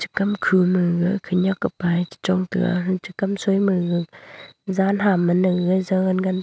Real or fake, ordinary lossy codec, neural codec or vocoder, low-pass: real; none; none; none